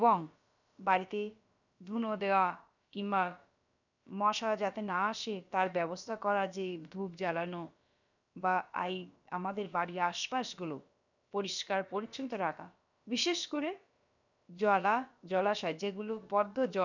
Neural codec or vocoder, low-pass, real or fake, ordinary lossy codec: codec, 16 kHz, about 1 kbps, DyCAST, with the encoder's durations; 7.2 kHz; fake; none